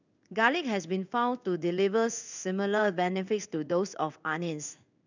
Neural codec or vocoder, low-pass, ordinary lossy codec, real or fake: codec, 16 kHz in and 24 kHz out, 1 kbps, XY-Tokenizer; 7.2 kHz; none; fake